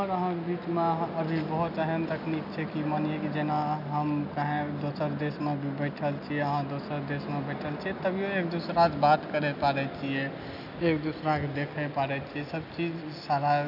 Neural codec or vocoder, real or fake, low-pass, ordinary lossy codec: none; real; 5.4 kHz; none